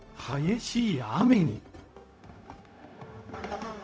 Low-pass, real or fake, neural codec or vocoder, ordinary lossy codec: none; fake; codec, 16 kHz, 0.4 kbps, LongCat-Audio-Codec; none